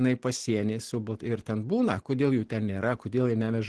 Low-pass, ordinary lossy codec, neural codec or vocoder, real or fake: 10.8 kHz; Opus, 16 kbps; none; real